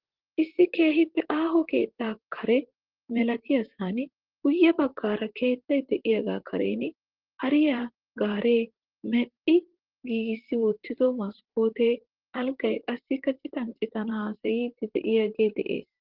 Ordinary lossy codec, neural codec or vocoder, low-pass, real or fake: Opus, 16 kbps; vocoder, 44.1 kHz, 128 mel bands, Pupu-Vocoder; 5.4 kHz; fake